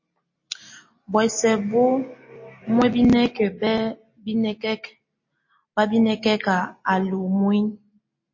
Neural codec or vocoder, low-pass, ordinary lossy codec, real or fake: none; 7.2 kHz; MP3, 32 kbps; real